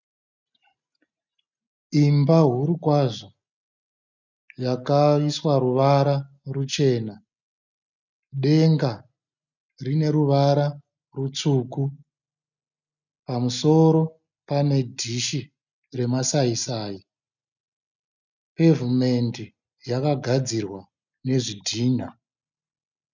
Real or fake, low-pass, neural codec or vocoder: real; 7.2 kHz; none